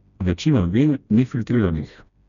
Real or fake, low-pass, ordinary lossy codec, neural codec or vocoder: fake; 7.2 kHz; none; codec, 16 kHz, 1 kbps, FreqCodec, smaller model